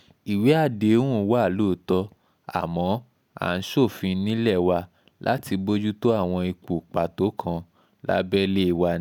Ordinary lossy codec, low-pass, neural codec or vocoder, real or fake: none; 19.8 kHz; none; real